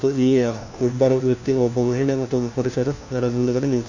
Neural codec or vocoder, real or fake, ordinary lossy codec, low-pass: codec, 16 kHz, 1 kbps, FunCodec, trained on LibriTTS, 50 frames a second; fake; none; 7.2 kHz